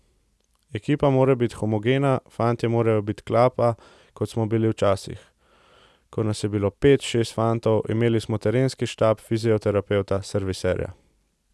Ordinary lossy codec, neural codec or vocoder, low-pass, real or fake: none; none; none; real